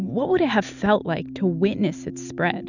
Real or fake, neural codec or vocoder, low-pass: real; none; 7.2 kHz